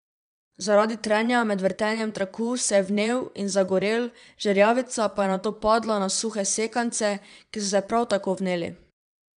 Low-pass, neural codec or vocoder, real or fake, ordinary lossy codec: 9.9 kHz; vocoder, 22.05 kHz, 80 mel bands, Vocos; fake; none